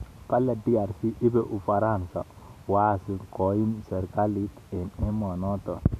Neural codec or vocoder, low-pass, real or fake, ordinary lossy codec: none; 14.4 kHz; real; none